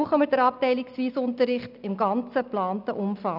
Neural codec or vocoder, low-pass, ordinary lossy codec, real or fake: none; 5.4 kHz; none; real